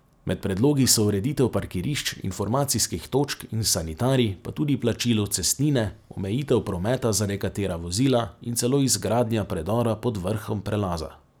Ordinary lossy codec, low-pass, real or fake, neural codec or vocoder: none; none; real; none